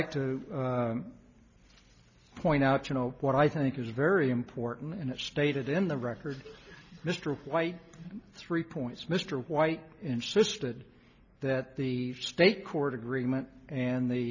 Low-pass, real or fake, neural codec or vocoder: 7.2 kHz; real; none